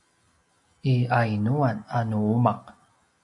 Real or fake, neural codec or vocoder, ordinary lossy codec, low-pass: real; none; AAC, 64 kbps; 10.8 kHz